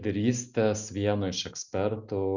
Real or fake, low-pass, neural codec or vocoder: real; 7.2 kHz; none